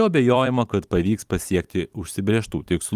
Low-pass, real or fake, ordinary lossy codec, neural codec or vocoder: 14.4 kHz; fake; Opus, 32 kbps; vocoder, 44.1 kHz, 128 mel bands every 256 samples, BigVGAN v2